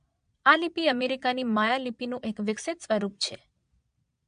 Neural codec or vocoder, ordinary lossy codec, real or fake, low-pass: vocoder, 22.05 kHz, 80 mel bands, Vocos; MP3, 64 kbps; fake; 9.9 kHz